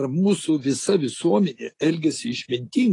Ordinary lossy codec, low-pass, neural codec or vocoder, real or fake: AAC, 32 kbps; 10.8 kHz; none; real